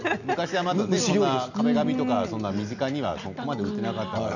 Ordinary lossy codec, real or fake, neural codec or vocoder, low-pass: none; real; none; 7.2 kHz